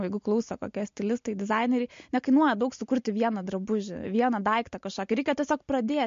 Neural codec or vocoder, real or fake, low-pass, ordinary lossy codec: none; real; 7.2 kHz; MP3, 48 kbps